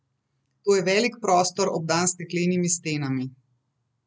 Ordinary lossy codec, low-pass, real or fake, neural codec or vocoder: none; none; real; none